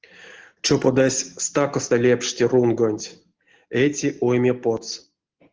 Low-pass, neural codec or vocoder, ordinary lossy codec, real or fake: 7.2 kHz; none; Opus, 16 kbps; real